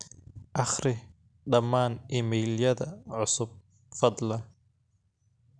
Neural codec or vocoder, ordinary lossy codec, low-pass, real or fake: none; none; 9.9 kHz; real